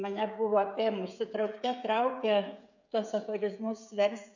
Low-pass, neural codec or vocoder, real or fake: 7.2 kHz; codec, 16 kHz, 16 kbps, FreqCodec, smaller model; fake